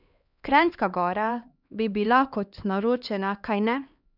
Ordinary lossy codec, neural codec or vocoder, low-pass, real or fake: none; codec, 16 kHz, 2 kbps, X-Codec, HuBERT features, trained on LibriSpeech; 5.4 kHz; fake